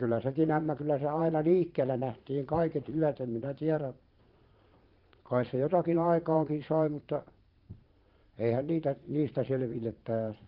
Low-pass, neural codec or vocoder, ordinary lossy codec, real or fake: 5.4 kHz; vocoder, 22.05 kHz, 80 mel bands, Vocos; Opus, 32 kbps; fake